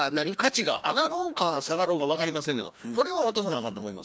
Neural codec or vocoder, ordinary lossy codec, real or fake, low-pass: codec, 16 kHz, 1 kbps, FreqCodec, larger model; none; fake; none